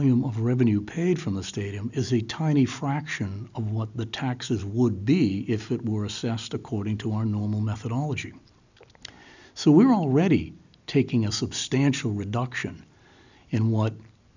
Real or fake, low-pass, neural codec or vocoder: real; 7.2 kHz; none